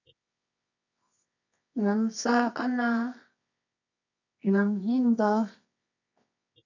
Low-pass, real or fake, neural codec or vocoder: 7.2 kHz; fake; codec, 24 kHz, 0.9 kbps, WavTokenizer, medium music audio release